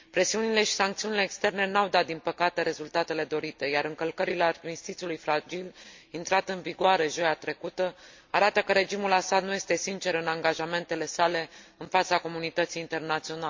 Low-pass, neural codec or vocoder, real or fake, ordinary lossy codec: 7.2 kHz; none; real; MP3, 48 kbps